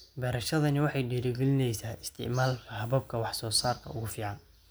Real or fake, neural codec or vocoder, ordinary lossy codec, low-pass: real; none; none; none